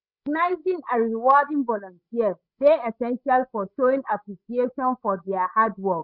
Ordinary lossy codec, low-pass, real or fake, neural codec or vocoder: none; 5.4 kHz; fake; codec, 16 kHz, 16 kbps, FreqCodec, larger model